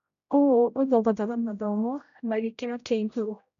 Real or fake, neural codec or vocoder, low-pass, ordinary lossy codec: fake; codec, 16 kHz, 0.5 kbps, X-Codec, HuBERT features, trained on general audio; 7.2 kHz; none